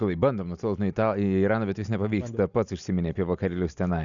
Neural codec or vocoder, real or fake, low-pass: none; real; 7.2 kHz